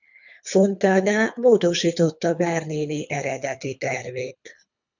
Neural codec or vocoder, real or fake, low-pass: codec, 24 kHz, 3 kbps, HILCodec; fake; 7.2 kHz